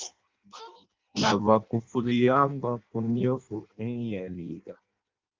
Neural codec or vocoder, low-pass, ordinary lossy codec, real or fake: codec, 16 kHz in and 24 kHz out, 0.6 kbps, FireRedTTS-2 codec; 7.2 kHz; Opus, 32 kbps; fake